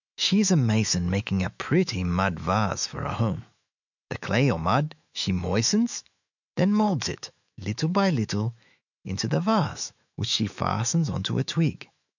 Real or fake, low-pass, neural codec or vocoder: fake; 7.2 kHz; autoencoder, 48 kHz, 128 numbers a frame, DAC-VAE, trained on Japanese speech